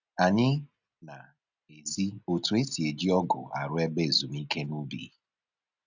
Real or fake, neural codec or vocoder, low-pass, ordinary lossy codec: real; none; 7.2 kHz; none